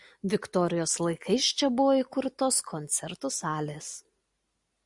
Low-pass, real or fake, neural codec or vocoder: 10.8 kHz; real; none